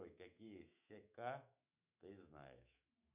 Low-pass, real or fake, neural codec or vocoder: 3.6 kHz; fake; autoencoder, 48 kHz, 128 numbers a frame, DAC-VAE, trained on Japanese speech